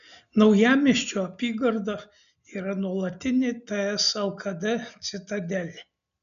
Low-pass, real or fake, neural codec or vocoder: 7.2 kHz; real; none